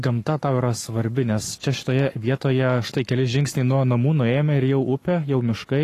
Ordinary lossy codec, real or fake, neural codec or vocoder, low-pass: AAC, 48 kbps; fake; codec, 44.1 kHz, 7.8 kbps, Pupu-Codec; 14.4 kHz